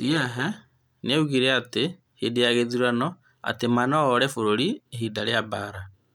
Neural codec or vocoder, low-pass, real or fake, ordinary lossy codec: none; 19.8 kHz; real; none